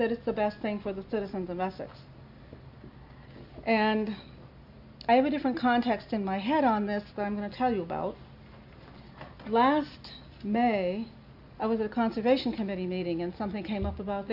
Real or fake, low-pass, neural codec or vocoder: real; 5.4 kHz; none